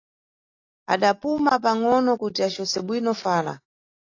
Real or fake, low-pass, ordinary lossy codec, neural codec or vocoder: real; 7.2 kHz; AAC, 32 kbps; none